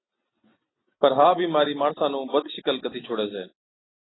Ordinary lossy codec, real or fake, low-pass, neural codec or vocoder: AAC, 16 kbps; real; 7.2 kHz; none